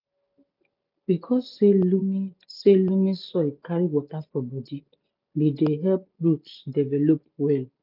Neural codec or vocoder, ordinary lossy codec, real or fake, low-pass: none; none; real; 5.4 kHz